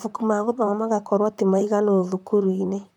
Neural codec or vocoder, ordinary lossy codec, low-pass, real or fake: vocoder, 44.1 kHz, 128 mel bands, Pupu-Vocoder; none; 19.8 kHz; fake